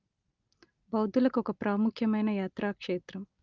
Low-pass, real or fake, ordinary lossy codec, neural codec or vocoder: 7.2 kHz; real; Opus, 32 kbps; none